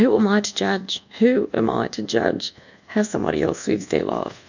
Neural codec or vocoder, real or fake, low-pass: codec, 24 kHz, 1.2 kbps, DualCodec; fake; 7.2 kHz